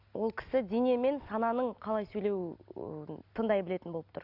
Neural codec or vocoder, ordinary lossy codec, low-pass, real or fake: none; Opus, 64 kbps; 5.4 kHz; real